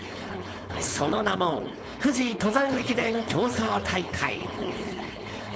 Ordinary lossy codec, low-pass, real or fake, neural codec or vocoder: none; none; fake; codec, 16 kHz, 4.8 kbps, FACodec